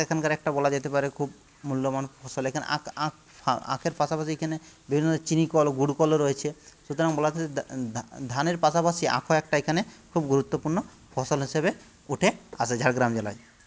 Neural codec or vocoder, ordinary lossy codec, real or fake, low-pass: none; none; real; none